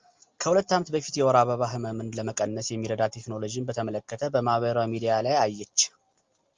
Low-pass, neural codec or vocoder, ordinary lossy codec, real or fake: 7.2 kHz; none; Opus, 32 kbps; real